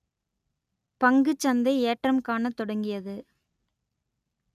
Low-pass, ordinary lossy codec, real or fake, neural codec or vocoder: 14.4 kHz; none; real; none